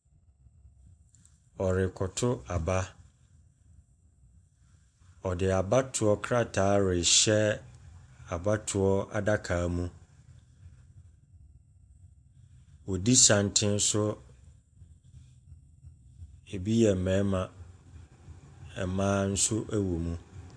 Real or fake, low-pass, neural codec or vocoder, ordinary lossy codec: real; 9.9 kHz; none; MP3, 64 kbps